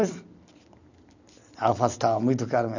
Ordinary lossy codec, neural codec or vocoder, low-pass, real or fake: none; none; 7.2 kHz; real